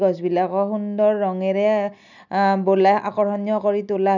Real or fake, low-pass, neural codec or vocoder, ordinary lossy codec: real; 7.2 kHz; none; none